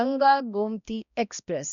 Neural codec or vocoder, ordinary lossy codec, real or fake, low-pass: codec, 16 kHz, 1 kbps, X-Codec, HuBERT features, trained on balanced general audio; none; fake; 7.2 kHz